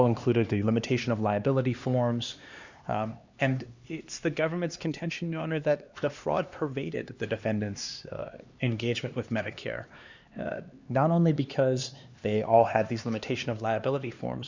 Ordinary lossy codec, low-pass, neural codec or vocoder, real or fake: Opus, 64 kbps; 7.2 kHz; codec, 16 kHz, 2 kbps, X-Codec, HuBERT features, trained on LibriSpeech; fake